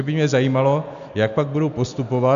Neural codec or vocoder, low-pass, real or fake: none; 7.2 kHz; real